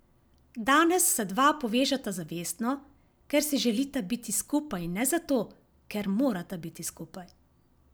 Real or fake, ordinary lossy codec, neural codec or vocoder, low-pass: real; none; none; none